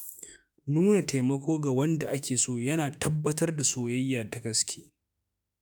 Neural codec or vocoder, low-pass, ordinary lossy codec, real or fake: autoencoder, 48 kHz, 32 numbers a frame, DAC-VAE, trained on Japanese speech; none; none; fake